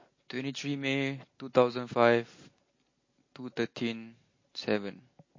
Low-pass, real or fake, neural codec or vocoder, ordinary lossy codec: 7.2 kHz; real; none; MP3, 32 kbps